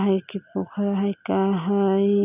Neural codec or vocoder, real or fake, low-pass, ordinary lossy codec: none; real; 3.6 kHz; none